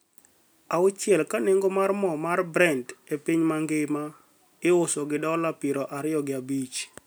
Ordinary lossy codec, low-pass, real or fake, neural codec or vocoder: none; none; real; none